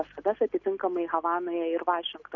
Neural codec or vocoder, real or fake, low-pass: none; real; 7.2 kHz